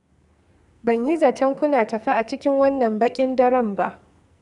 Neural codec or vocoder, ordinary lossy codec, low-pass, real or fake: codec, 44.1 kHz, 2.6 kbps, SNAC; none; 10.8 kHz; fake